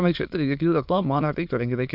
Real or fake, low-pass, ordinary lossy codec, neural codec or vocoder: fake; 5.4 kHz; AAC, 48 kbps; autoencoder, 22.05 kHz, a latent of 192 numbers a frame, VITS, trained on many speakers